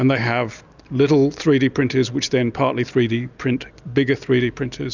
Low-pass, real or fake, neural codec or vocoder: 7.2 kHz; real; none